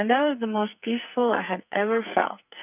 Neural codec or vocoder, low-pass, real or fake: codec, 44.1 kHz, 2.6 kbps, SNAC; 3.6 kHz; fake